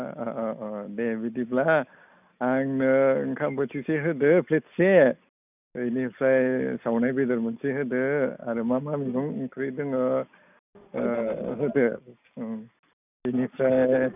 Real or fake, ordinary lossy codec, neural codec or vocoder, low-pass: real; none; none; 3.6 kHz